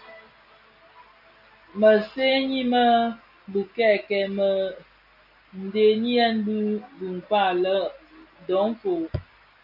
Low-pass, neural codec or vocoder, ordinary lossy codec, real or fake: 5.4 kHz; none; AAC, 48 kbps; real